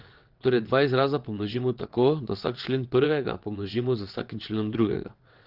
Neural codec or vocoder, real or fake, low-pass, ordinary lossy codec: vocoder, 22.05 kHz, 80 mel bands, WaveNeXt; fake; 5.4 kHz; Opus, 16 kbps